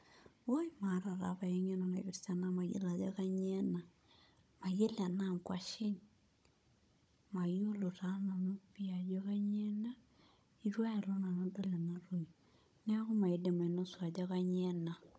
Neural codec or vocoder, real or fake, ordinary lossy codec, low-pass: codec, 16 kHz, 16 kbps, FunCodec, trained on Chinese and English, 50 frames a second; fake; none; none